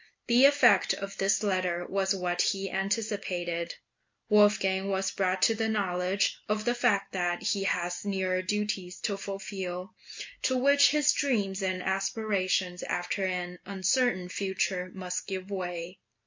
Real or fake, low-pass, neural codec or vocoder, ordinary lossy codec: real; 7.2 kHz; none; MP3, 48 kbps